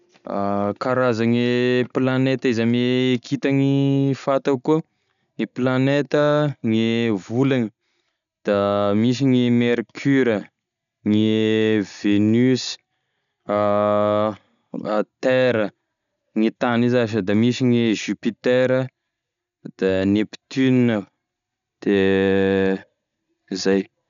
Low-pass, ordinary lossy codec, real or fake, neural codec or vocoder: 7.2 kHz; none; real; none